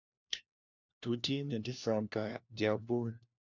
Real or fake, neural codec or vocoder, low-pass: fake; codec, 16 kHz, 1 kbps, FunCodec, trained on LibriTTS, 50 frames a second; 7.2 kHz